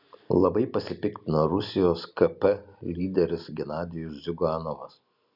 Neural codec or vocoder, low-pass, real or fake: none; 5.4 kHz; real